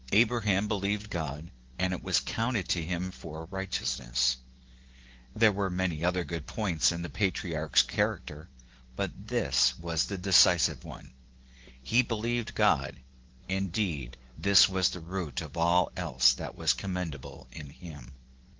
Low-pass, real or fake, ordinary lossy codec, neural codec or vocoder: 7.2 kHz; fake; Opus, 16 kbps; vocoder, 44.1 kHz, 128 mel bands every 512 samples, BigVGAN v2